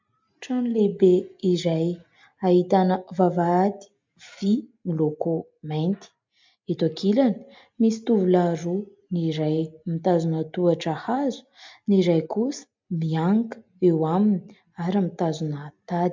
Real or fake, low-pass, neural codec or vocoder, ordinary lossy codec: real; 7.2 kHz; none; MP3, 64 kbps